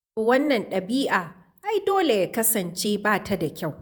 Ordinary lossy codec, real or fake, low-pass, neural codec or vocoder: none; fake; none; vocoder, 48 kHz, 128 mel bands, Vocos